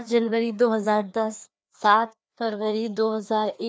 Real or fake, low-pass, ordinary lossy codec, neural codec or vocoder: fake; none; none; codec, 16 kHz, 2 kbps, FreqCodec, larger model